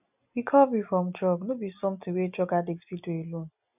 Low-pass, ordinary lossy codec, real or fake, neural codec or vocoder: 3.6 kHz; none; real; none